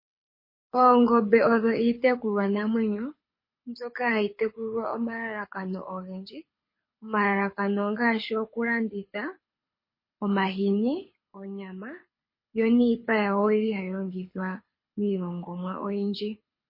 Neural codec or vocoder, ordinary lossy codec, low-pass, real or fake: codec, 24 kHz, 6 kbps, HILCodec; MP3, 24 kbps; 5.4 kHz; fake